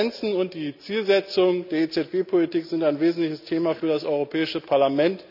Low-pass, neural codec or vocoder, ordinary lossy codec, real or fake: 5.4 kHz; none; none; real